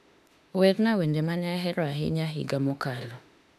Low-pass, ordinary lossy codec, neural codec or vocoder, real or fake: 14.4 kHz; none; autoencoder, 48 kHz, 32 numbers a frame, DAC-VAE, trained on Japanese speech; fake